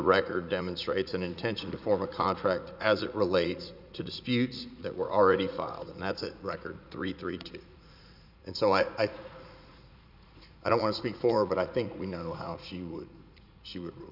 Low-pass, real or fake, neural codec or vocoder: 5.4 kHz; fake; vocoder, 44.1 kHz, 80 mel bands, Vocos